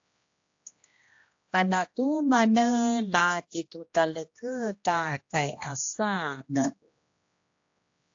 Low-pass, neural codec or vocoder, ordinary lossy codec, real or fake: 7.2 kHz; codec, 16 kHz, 1 kbps, X-Codec, HuBERT features, trained on general audio; AAC, 48 kbps; fake